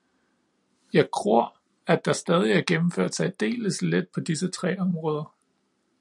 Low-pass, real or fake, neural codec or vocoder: 10.8 kHz; real; none